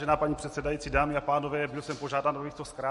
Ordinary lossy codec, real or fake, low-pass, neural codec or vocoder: MP3, 48 kbps; real; 14.4 kHz; none